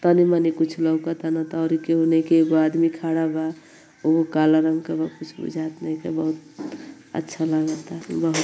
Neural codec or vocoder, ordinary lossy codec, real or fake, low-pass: none; none; real; none